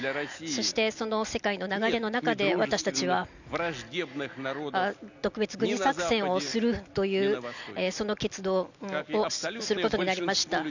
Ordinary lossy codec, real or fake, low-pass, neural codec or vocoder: none; real; 7.2 kHz; none